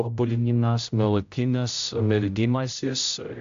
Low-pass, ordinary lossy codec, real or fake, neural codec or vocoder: 7.2 kHz; AAC, 64 kbps; fake; codec, 16 kHz, 0.5 kbps, X-Codec, HuBERT features, trained on general audio